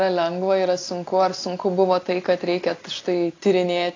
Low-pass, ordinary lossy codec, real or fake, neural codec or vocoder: 7.2 kHz; AAC, 32 kbps; real; none